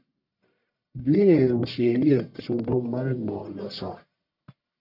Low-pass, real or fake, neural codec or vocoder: 5.4 kHz; fake; codec, 44.1 kHz, 1.7 kbps, Pupu-Codec